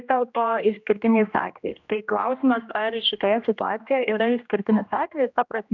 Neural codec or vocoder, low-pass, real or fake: codec, 16 kHz, 1 kbps, X-Codec, HuBERT features, trained on general audio; 7.2 kHz; fake